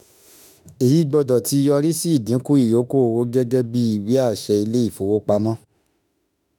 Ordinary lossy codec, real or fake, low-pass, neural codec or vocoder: none; fake; 19.8 kHz; autoencoder, 48 kHz, 32 numbers a frame, DAC-VAE, trained on Japanese speech